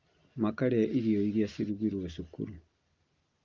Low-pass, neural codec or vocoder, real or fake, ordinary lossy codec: 7.2 kHz; none; real; Opus, 32 kbps